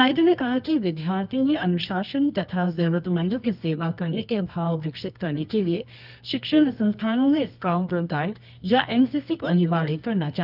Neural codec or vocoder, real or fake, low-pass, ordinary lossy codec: codec, 24 kHz, 0.9 kbps, WavTokenizer, medium music audio release; fake; 5.4 kHz; none